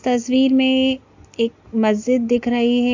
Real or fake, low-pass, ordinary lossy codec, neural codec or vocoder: real; 7.2 kHz; AAC, 48 kbps; none